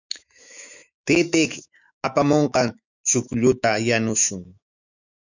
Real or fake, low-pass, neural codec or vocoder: fake; 7.2 kHz; autoencoder, 48 kHz, 128 numbers a frame, DAC-VAE, trained on Japanese speech